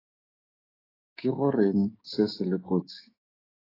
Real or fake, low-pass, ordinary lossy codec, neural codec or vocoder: fake; 5.4 kHz; AAC, 24 kbps; codec, 16 kHz, 6 kbps, DAC